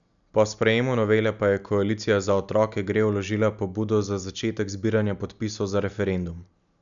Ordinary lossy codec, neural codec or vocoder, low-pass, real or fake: none; none; 7.2 kHz; real